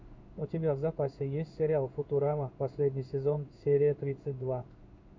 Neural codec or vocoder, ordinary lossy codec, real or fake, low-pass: codec, 16 kHz in and 24 kHz out, 1 kbps, XY-Tokenizer; MP3, 48 kbps; fake; 7.2 kHz